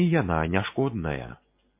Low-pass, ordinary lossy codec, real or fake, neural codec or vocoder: 3.6 kHz; MP3, 24 kbps; real; none